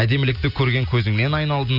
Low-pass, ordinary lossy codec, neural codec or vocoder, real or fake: 5.4 kHz; none; none; real